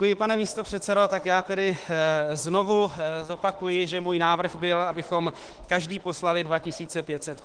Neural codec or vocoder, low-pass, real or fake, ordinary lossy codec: autoencoder, 48 kHz, 32 numbers a frame, DAC-VAE, trained on Japanese speech; 9.9 kHz; fake; Opus, 16 kbps